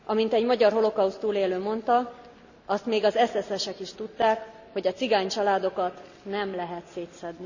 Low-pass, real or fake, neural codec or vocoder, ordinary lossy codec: 7.2 kHz; real; none; none